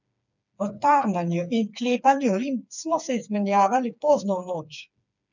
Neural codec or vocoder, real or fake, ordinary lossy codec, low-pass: codec, 16 kHz, 4 kbps, FreqCodec, smaller model; fake; none; 7.2 kHz